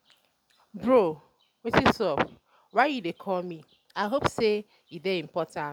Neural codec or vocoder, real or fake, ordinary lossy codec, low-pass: none; real; none; 19.8 kHz